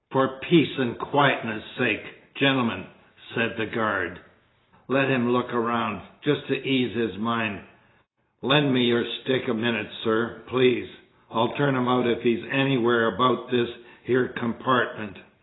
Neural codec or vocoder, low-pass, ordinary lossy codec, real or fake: none; 7.2 kHz; AAC, 16 kbps; real